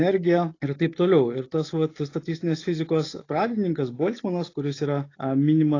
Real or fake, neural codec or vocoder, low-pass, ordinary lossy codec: real; none; 7.2 kHz; AAC, 32 kbps